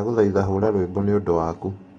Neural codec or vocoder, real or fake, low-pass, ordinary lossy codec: none; real; 9.9 kHz; AAC, 32 kbps